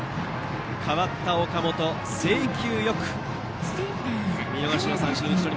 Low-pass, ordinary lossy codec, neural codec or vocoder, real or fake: none; none; none; real